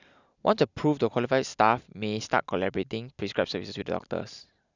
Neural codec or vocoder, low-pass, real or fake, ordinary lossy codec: none; 7.2 kHz; real; none